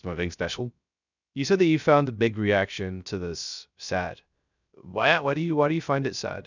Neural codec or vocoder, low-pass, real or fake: codec, 16 kHz, 0.3 kbps, FocalCodec; 7.2 kHz; fake